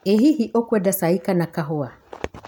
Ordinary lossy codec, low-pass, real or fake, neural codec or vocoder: none; 19.8 kHz; real; none